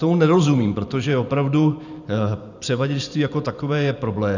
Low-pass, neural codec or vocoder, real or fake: 7.2 kHz; vocoder, 44.1 kHz, 128 mel bands every 512 samples, BigVGAN v2; fake